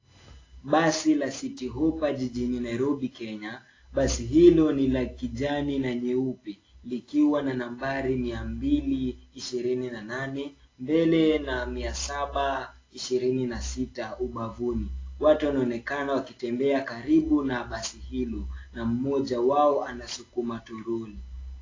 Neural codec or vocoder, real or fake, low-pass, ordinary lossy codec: none; real; 7.2 kHz; AAC, 32 kbps